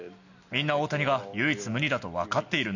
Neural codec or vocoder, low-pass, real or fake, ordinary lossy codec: none; 7.2 kHz; real; none